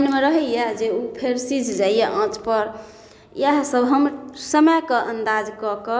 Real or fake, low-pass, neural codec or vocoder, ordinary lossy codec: real; none; none; none